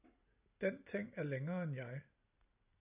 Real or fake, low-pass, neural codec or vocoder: real; 3.6 kHz; none